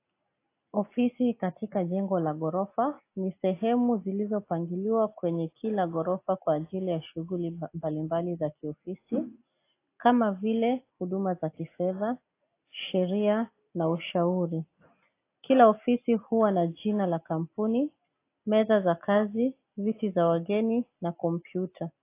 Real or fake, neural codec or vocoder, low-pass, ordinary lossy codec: real; none; 3.6 kHz; AAC, 24 kbps